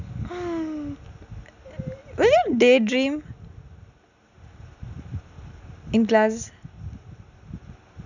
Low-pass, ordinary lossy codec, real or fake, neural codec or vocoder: 7.2 kHz; none; real; none